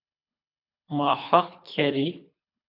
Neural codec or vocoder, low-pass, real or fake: codec, 24 kHz, 3 kbps, HILCodec; 5.4 kHz; fake